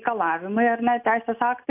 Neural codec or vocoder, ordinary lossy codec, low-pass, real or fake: none; AAC, 32 kbps; 3.6 kHz; real